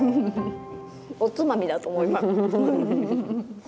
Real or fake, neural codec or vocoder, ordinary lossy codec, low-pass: real; none; none; none